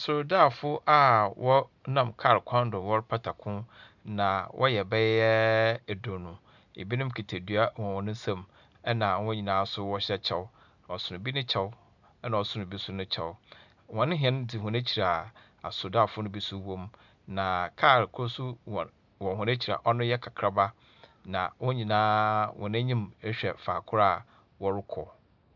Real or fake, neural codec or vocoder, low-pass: real; none; 7.2 kHz